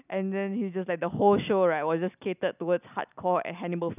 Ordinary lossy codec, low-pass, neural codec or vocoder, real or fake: none; 3.6 kHz; none; real